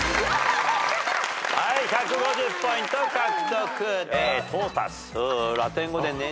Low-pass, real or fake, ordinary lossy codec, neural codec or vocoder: none; real; none; none